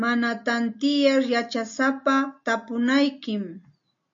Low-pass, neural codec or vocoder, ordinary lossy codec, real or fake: 7.2 kHz; none; MP3, 48 kbps; real